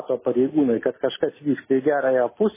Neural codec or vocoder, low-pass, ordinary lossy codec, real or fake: none; 3.6 kHz; MP3, 16 kbps; real